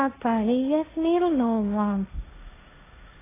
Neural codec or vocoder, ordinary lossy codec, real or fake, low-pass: codec, 16 kHz, 1.1 kbps, Voila-Tokenizer; AAC, 16 kbps; fake; 3.6 kHz